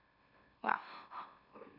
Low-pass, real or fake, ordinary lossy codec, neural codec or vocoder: 5.4 kHz; fake; none; autoencoder, 44.1 kHz, a latent of 192 numbers a frame, MeloTTS